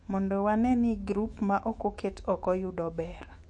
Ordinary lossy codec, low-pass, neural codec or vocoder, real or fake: MP3, 48 kbps; 10.8 kHz; autoencoder, 48 kHz, 128 numbers a frame, DAC-VAE, trained on Japanese speech; fake